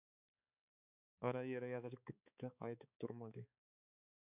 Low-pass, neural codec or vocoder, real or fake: 3.6 kHz; codec, 16 kHz, 4 kbps, X-Codec, HuBERT features, trained on balanced general audio; fake